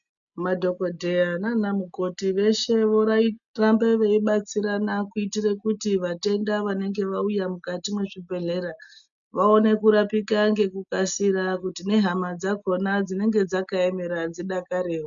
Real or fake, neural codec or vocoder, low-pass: real; none; 7.2 kHz